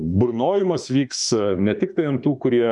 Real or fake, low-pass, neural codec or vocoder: fake; 10.8 kHz; autoencoder, 48 kHz, 32 numbers a frame, DAC-VAE, trained on Japanese speech